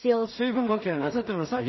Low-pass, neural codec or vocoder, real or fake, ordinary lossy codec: 7.2 kHz; codec, 16 kHz in and 24 kHz out, 0.4 kbps, LongCat-Audio-Codec, two codebook decoder; fake; MP3, 24 kbps